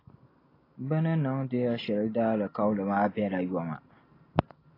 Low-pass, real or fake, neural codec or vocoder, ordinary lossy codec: 5.4 kHz; real; none; AAC, 24 kbps